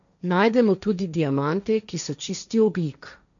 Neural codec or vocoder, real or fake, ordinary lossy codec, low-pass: codec, 16 kHz, 1.1 kbps, Voila-Tokenizer; fake; none; 7.2 kHz